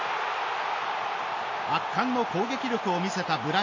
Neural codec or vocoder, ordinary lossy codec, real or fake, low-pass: none; MP3, 32 kbps; real; 7.2 kHz